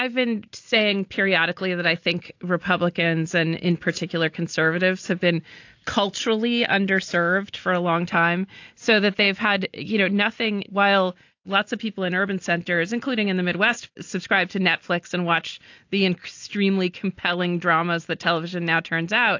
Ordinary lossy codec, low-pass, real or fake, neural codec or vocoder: AAC, 48 kbps; 7.2 kHz; real; none